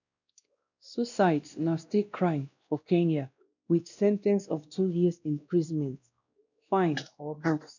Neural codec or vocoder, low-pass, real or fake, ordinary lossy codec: codec, 16 kHz, 1 kbps, X-Codec, WavLM features, trained on Multilingual LibriSpeech; 7.2 kHz; fake; none